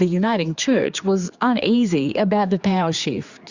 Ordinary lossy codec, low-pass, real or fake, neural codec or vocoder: Opus, 64 kbps; 7.2 kHz; fake; codec, 16 kHz, 4 kbps, X-Codec, HuBERT features, trained on general audio